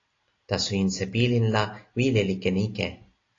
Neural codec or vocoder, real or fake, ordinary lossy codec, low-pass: none; real; AAC, 32 kbps; 7.2 kHz